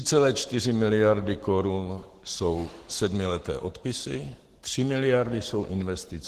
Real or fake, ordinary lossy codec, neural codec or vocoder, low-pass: fake; Opus, 16 kbps; codec, 44.1 kHz, 7.8 kbps, Pupu-Codec; 14.4 kHz